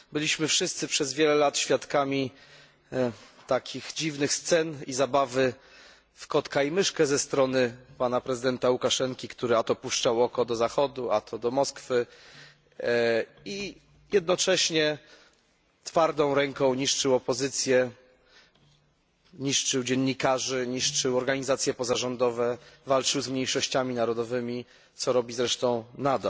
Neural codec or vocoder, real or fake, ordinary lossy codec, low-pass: none; real; none; none